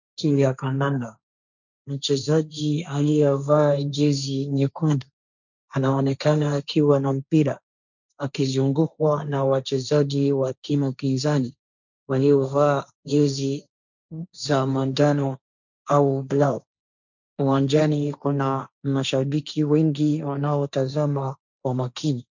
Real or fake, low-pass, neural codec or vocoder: fake; 7.2 kHz; codec, 16 kHz, 1.1 kbps, Voila-Tokenizer